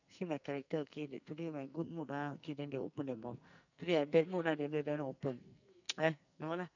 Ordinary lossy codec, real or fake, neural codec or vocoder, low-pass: none; fake; codec, 44.1 kHz, 2.6 kbps, SNAC; 7.2 kHz